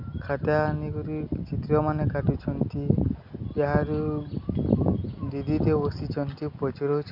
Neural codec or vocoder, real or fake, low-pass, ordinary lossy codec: none; real; 5.4 kHz; none